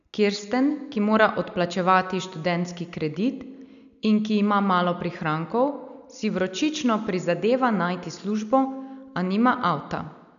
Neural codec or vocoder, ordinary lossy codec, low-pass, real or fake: none; none; 7.2 kHz; real